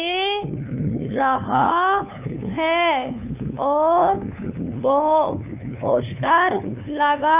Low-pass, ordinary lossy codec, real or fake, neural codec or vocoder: 3.6 kHz; none; fake; codec, 16 kHz, 2 kbps, FunCodec, trained on LibriTTS, 25 frames a second